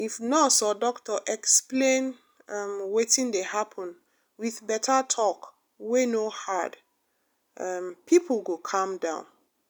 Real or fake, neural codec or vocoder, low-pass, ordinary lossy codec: real; none; none; none